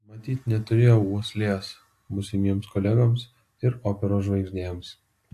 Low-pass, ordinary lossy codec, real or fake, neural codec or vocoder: 14.4 kHz; AAC, 64 kbps; real; none